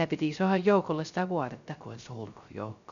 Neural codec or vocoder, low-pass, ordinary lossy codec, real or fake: codec, 16 kHz, 0.3 kbps, FocalCodec; 7.2 kHz; none; fake